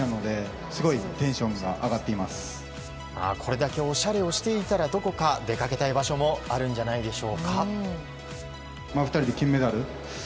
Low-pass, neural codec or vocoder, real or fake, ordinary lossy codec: none; none; real; none